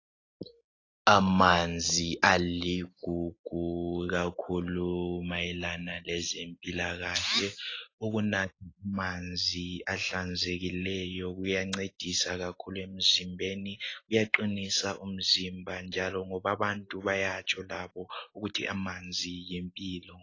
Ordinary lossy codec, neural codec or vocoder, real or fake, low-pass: AAC, 32 kbps; none; real; 7.2 kHz